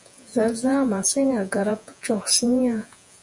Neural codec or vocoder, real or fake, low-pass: vocoder, 48 kHz, 128 mel bands, Vocos; fake; 10.8 kHz